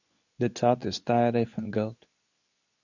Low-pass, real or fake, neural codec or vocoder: 7.2 kHz; fake; codec, 24 kHz, 0.9 kbps, WavTokenizer, medium speech release version 2